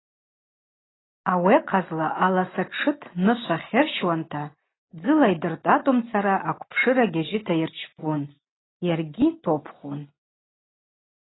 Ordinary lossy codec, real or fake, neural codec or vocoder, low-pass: AAC, 16 kbps; real; none; 7.2 kHz